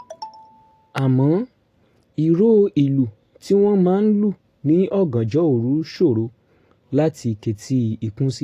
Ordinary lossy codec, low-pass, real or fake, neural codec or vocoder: AAC, 48 kbps; 14.4 kHz; real; none